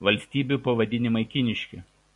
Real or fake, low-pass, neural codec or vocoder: real; 10.8 kHz; none